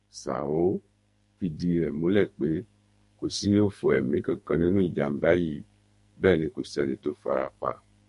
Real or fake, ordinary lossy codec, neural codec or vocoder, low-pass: fake; MP3, 48 kbps; codec, 44.1 kHz, 2.6 kbps, SNAC; 14.4 kHz